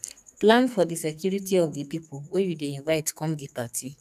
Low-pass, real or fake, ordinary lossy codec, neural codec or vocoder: 14.4 kHz; fake; none; codec, 44.1 kHz, 2.6 kbps, SNAC